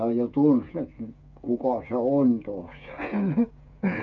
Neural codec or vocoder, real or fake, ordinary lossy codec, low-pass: codec, 16 kHz, 8 kbps, FreqCodec, smaller model; fake; none; 7.2 kHz